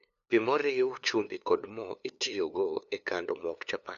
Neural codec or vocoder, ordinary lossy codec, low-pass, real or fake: codec, 16 kHz, 2 kbps, FunCodec, trained on LibriTTS, 25 frames a second; none; 7.2 kHz; fake